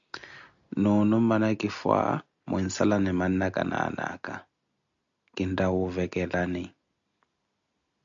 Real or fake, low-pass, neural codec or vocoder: real; 7.2 kHz; none